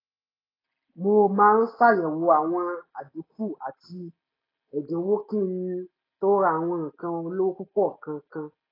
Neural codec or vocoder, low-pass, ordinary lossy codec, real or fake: none; 5.4 kHz; AAC, 24 kbps; real